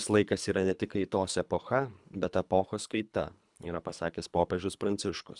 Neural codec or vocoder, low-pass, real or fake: codec, 24 kHz, 3 kbps, HILCodec; 10.8 kHz; fake